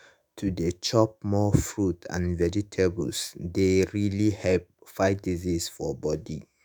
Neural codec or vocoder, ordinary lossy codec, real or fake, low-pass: autoencoder, 48 kHz, 128 numbers a frame, DAC-VAE, trained on Japanese speech; none; fake; none